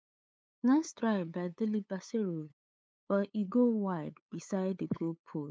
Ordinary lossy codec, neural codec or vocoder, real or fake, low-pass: none; codec, 16 kHz, 8 kbps, FunCodec, trained on LibriTTS, 25 frames a second; fake; none